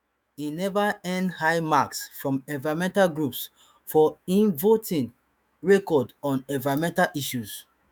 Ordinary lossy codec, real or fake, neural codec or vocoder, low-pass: none; fake; autoencoder, 48 kHz, 128 numbers a frame, DAC-VAE, trained on Japanese speech; none